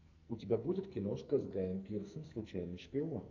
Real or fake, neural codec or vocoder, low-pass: fake; codec, 44.1 kHz, 2.6 kbps, SNAC; 7.2 kHz